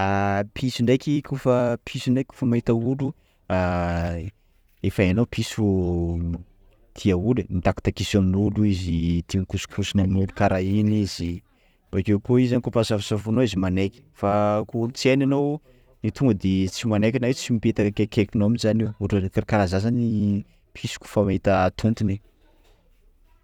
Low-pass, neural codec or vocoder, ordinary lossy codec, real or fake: 19.8 kHz; vocoder, 44.1 kHz, 128 mel bands every 256 samples, BigVGAN v2; none; fake